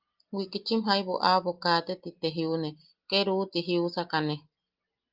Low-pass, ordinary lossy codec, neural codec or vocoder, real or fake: 5.4 kHz; Opus, 32 kbps; none; real